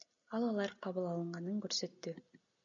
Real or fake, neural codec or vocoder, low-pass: real; none; 7.2 kHz